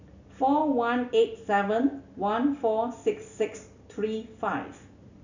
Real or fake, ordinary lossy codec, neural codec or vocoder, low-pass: real; none; none; 7.2 kHz